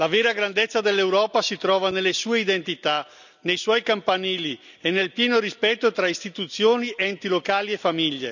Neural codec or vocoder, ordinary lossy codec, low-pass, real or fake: none; none; 7.2 kHz; real